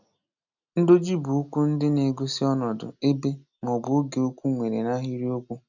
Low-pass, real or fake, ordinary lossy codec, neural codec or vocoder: 7.2 kHz; real; none; none